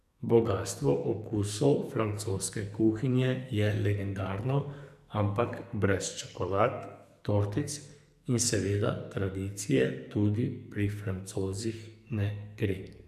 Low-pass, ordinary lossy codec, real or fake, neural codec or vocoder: 14.4 kHz; none; fake; codec, 44.1 kHz, 2.6 kbps, SNAC